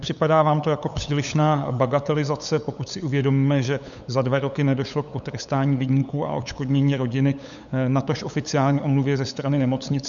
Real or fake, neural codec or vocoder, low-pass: fake; codec, 16 kHz, 8 kbps, FunCodec, trained on LibriTTS, 25 frames a second; 7.2 kHz